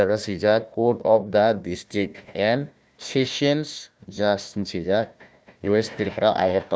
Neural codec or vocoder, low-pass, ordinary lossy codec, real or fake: codec, 16 kHz, 1 kbps, FunCodec, trained on Chinese and English, 50 frames a second; none; none; fake